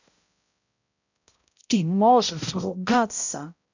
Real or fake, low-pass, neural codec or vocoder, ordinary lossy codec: fake; 7.2 kHz; codec, 16 kHz, 0.5 kbps, X-Codec, HuBERT features, trained on balanced general audio; none